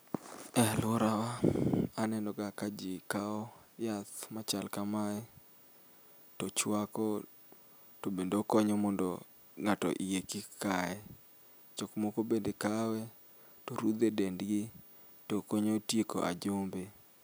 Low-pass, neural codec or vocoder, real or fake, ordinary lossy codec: none; none; real; none